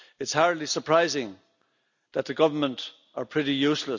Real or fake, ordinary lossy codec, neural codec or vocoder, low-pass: real; none; none; 7.2 kHz